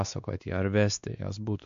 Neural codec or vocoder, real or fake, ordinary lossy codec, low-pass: codec, 16 kHz, 2 kbps, X-Codec, WavLM features, trained on Multilingual LibriSpeech; fake; MP3, 64 kbps; 7.2 kHz